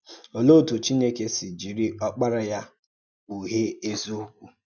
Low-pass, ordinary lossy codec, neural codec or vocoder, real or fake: 7.2 kHz; none; none; real